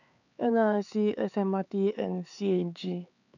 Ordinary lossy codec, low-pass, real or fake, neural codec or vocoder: none; 7.2 kHz; fake; codec, 16 kHz, 4 kbps, X-Codec, HuBERT features, trained on LibriSpeech